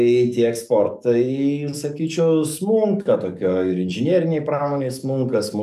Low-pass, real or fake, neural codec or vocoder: 14.4 kHz; fake; codec, 44.1 kHz, 7.8 kbps, DAC